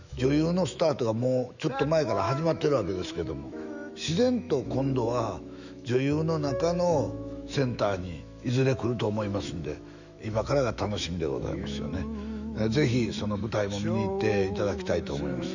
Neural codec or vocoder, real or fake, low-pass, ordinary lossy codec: autoencoder, 48 kHz, 128 numbers a frame, DAC-VAE, trained on Japanese speech; fake; 7.2 kHz; none